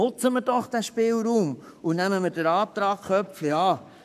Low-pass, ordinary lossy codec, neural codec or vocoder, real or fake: 14.4 kHz; none; codec, 44.1 kHz, 7.8 kbps, Pupu-Codec; fake